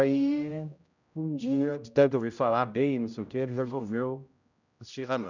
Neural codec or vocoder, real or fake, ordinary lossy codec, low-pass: codec, 16 kHz, 0.5 kbps, X-Codec, HuBERT features, trained on general audio; fake; none; 7.2 kHz